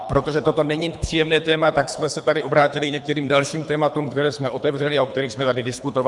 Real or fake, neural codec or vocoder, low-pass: fake; codec, 24 kHz, 3 kbps, HILCodec; 10.8 kHz